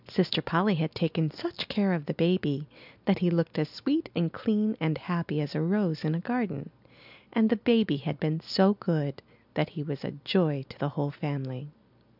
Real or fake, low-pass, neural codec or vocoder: real; 5.4 kHz; none